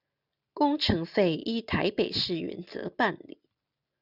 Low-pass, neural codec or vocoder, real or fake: 5.4 kHz; none; real